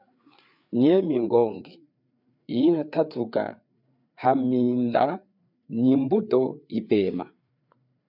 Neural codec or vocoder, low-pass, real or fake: codec, 16 kHz, 4 kbps, FreqCodec, larger model; 5.4 kHz; fake